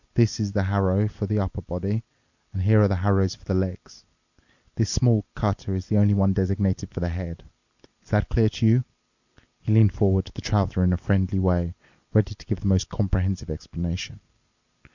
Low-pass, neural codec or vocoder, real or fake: 7.2 kHz; none; real